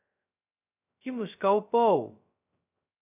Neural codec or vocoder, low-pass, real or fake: codec, 16 kHz, 0.2 kbps, FocalCodec; 3.6 kHz; fake